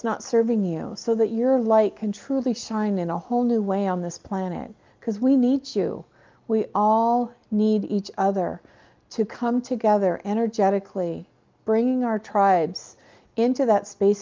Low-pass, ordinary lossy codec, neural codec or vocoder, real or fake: 7.2 kHz; Opus, 32 kbps; none; real